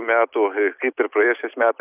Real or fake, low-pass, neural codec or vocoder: real; 3.6 kHz; none